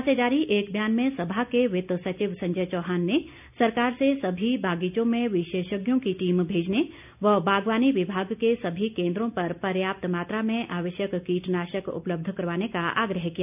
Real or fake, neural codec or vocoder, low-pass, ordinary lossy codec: real; none; 3.6 kHz; none